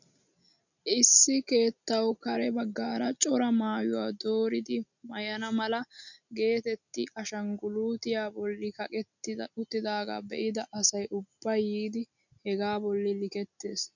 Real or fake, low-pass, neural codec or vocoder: real; 7.2 kHz; none